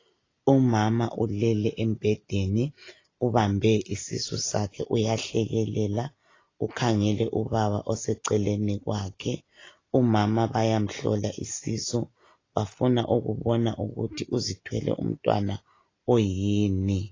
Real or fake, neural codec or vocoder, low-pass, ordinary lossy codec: real; none; 7.2 kHz; AAC, 32 kbps